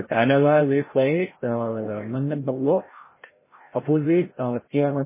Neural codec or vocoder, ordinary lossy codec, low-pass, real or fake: codec, 16 kHz, 0.5 kbps, FreqCodec, larger model; MP3, 16 kbps; 3.6 kHz; fake